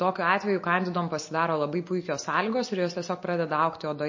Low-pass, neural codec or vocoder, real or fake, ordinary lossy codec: 7.2 kHz; none; real; MP3, 32 kbps